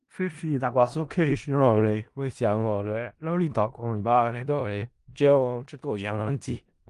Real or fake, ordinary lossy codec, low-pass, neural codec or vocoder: fake; Opus, 24 kbps; 10.8 kHz; codec, 16 kHz in and 24 kHz out, 0.4 kbps, LongCat-Audio-Codec, four codebook decoder